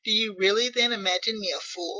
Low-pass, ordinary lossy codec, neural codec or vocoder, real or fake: 7.2 kHz; Opus, 32 kbps; none; real